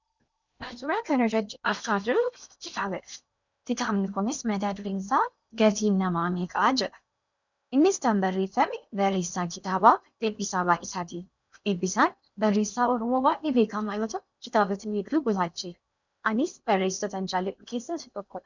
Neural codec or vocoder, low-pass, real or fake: codec, 16 kHz in and 24 kHz out, 0.8 kbps, FocalCodec, streaming, 65536 codes; 7.2 kHz; fake